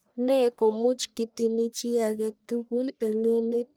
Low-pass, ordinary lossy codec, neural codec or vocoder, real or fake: none; none; codec, 44.1 kHz, 1.7 kbps, Pupu-Codec; fake